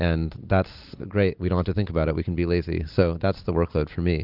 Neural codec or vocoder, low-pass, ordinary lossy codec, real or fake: none; 5.4 kHz; Opus, 24 kbps; real